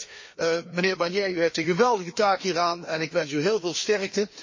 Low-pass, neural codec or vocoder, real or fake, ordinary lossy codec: 7.2 kHz; codec, 16 kHz, 2 kbps, FreqCodec, larger model; fake; MP3, 32 kbps